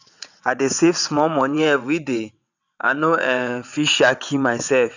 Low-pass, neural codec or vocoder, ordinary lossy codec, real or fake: 7.2 kHz; vocoder, 22.05 kHz, 80 mel bands, WaveNeXt; none; fake